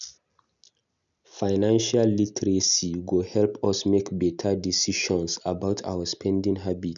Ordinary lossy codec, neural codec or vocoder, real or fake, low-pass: none; none; real; 7.2 kHz